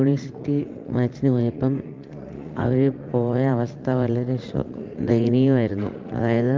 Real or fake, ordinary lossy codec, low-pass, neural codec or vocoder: fake; Opus, 32 kbps; 7.2 kHz; vocoder, 44.1 kHz, 80 mel bands, Vocos